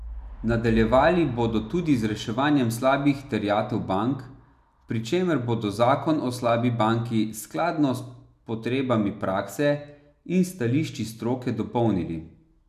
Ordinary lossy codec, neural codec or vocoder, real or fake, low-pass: none; none; real; 14.4 kHz